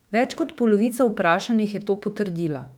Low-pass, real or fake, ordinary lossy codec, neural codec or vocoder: 19.8 kHz; fake; none; autoencoder, 48 kHz, 32 numbers a frame, DAC-VAE, trained on Japanese speech